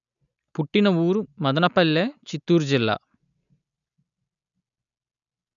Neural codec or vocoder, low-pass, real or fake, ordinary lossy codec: none; 7.2 kHz; real; none